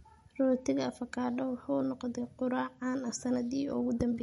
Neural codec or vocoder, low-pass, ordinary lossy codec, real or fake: none; 19.8 kHz; MP3, 48 kbps; real